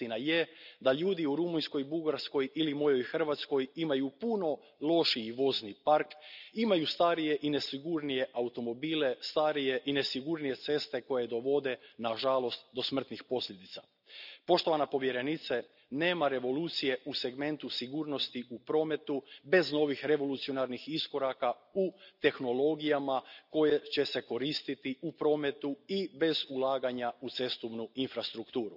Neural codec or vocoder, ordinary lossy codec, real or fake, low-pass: none; none; real; 5.4 kHz